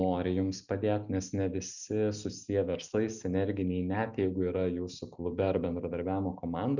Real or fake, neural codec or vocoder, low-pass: real; none; 7.2 kHz